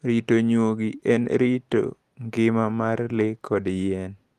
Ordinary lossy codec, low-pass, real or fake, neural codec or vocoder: Opus, 24 kbps; 14.4 kHz; real; none